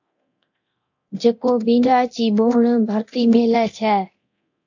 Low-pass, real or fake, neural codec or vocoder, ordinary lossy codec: 7.2 kHz; fake; codec, 24 kHz, 0.9 kbps, DualCodec; AAC, 48 kbps